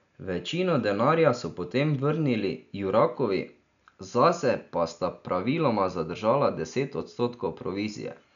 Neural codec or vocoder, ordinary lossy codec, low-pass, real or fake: none; none; 7.2 kHz; real